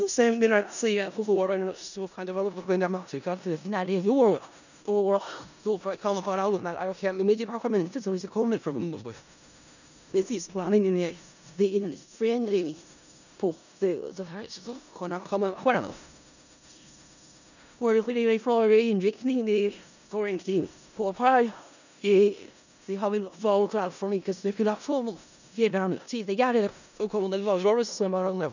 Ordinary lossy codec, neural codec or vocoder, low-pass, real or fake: none; codec, 16 kHz in and 24 kHz out, 0.4 kbps, LongCat-Audio-Codec, four codebook decoder; 7.2 kHz; fake